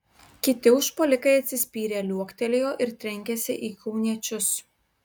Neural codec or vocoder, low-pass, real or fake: none; 19.8 kHz; real